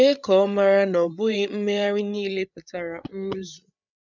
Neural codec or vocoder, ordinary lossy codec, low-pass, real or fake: codec, 16 kHz, 8 kbps, FreqCodec, larger model; none; 7.2 kHz; fake